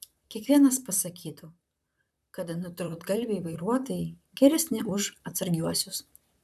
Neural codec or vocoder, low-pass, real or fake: vocoder, 44.1 kHz, 128 mel bands, Pupu-Vocoder; 14.4 kHz; fake